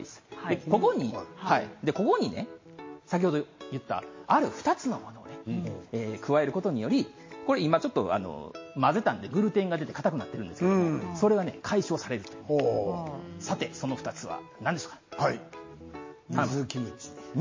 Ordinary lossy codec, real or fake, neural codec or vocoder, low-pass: MP3, 32 kbps; real; none; 7.2 kHz